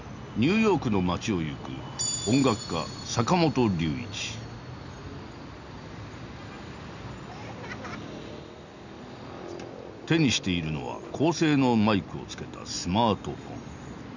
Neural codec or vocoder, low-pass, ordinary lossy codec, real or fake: none; 7.2 kHz; none; real